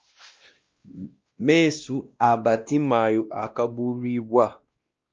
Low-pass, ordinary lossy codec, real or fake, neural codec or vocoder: 7.2 kHz; Opus, 24 kbps; fake; codec, 16 kHz, 1 kbps, X-Codec, WavLM features, trained on Multilingual LibriSpeech